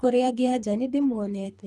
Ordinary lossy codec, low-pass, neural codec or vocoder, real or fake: none; none; codec, 24 kHz, 3 kbps, HILCodec; fake